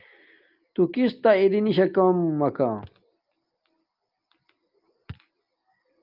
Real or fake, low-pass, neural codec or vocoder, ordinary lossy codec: real; 5.4 kHz; none; Opus, 32 kbps